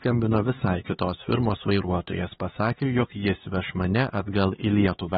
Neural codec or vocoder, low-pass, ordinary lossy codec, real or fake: codec, 16 kHz, 4 kbps, X-Codec, HuBERT features, trained on LibriSpeech; 7.2 kHz; AAC, 16 kbps; fake